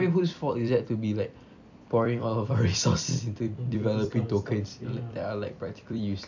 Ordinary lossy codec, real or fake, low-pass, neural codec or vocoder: none; fake; 7.2 kHz; vocoder, 44.1 kHz, 128 mel bands every 256 samples, BigVGAN v2